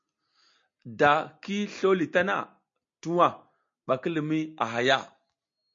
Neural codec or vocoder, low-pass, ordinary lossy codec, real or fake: none; 7.2 kHz; MP3, 48 kbps; real